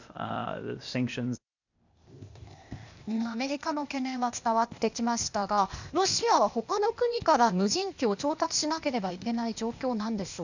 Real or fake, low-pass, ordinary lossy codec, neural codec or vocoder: fake; 7.2 kHz; none; codec, 16 kHz, 0.8 kbps, ZipCodec